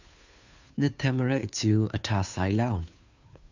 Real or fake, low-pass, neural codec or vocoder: fake; 7.2 kHz; codec, 16 kHz, 2 kbps, FunCodec, trained on Chinese and English, 25 frames a second